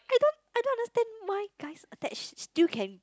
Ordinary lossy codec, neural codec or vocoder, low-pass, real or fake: none; none; none; real